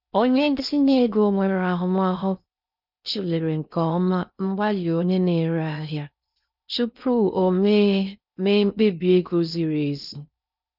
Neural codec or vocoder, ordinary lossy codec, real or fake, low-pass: codec, 16 kHz in and 24 kHz out, 0.6 kbps, FocalCodec, streaming, 4096 codes; none; fake; 5.4 kHz